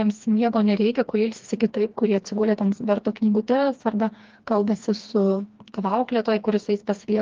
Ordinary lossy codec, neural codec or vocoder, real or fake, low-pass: Opus, 32 kbps; codec, 16 kHz, 2 kbps, FreqCodec, smaller model; fake; 7.2 kHz